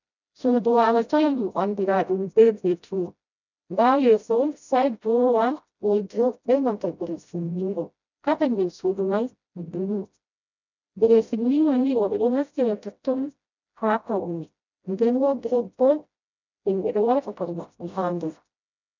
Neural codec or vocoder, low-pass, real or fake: codec, 16 kHz, 0.5 kbps, FreqCodec, smaller model; 7.2 kHz; fake